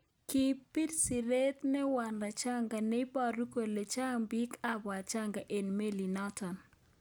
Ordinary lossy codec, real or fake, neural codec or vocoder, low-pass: none; real; none; none